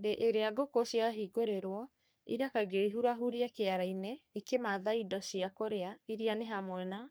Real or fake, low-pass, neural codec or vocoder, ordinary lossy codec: fake; none; codec, 44.1 kHz, 3.4 kbps, Pupu-Codec; none